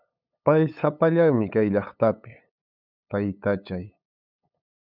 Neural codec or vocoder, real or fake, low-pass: codec, 16 kHz, 8 kbps, FunCodec, trained on LibriTTS, 25 frames a second; fake; 5.4 kHz